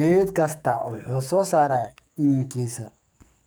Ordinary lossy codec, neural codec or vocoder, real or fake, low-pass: none; codec, 44.1 kHz, 2.6 kbps, SNAC; fake; none